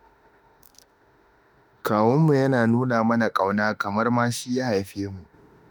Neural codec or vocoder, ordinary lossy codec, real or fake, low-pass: autoencoder, 48 kHz, 32 numbers a frame, DAC-VAE, trained on Japanese speech; none; fake; none